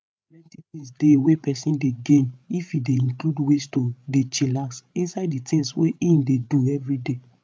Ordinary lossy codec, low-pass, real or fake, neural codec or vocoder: none; none; fake; codec, 16 kHz, 16 kbps, FreqCodec, larger model